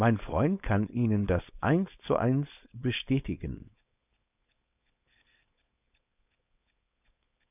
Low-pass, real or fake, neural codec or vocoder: 3.6 kHz; fake; codec, 16 kHz, 4.8 kbps, FACodec